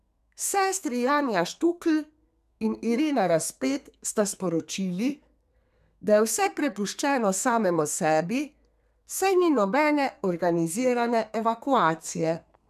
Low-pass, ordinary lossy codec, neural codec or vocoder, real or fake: 14.4 kHz; none; codec, 32 kHz, 1.9 kbps, SNAC; fake